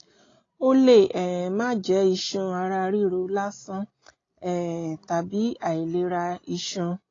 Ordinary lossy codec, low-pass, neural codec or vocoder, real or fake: AAC, 32 kbps; 7.2 kHz; none; real